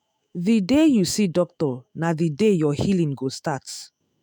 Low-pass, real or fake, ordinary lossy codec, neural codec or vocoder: none; fake; none; autoencoder, 48 kHz, 128 numbers a frame, DAC-VAE, trained on Japanese speech